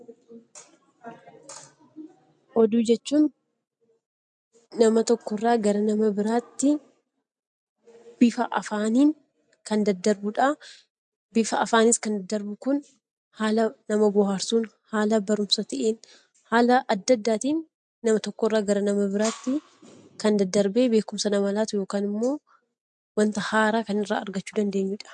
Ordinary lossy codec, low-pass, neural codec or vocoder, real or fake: MP3, 64 kbps; 9.9 kHz; none; real